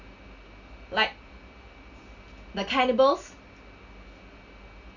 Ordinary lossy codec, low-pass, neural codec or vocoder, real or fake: none; 7.2 kHz; none; real